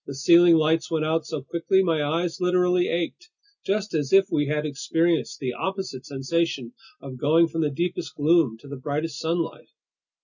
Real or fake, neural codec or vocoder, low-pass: real; none; 7.2 kHz